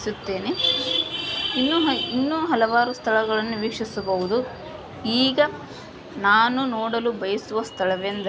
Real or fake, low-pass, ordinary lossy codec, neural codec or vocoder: real; none; none; none